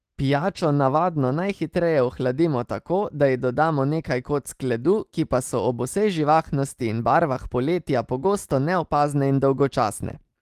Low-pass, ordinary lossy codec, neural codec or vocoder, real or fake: 14.4 kHz; Opus, 24 kbps; none; real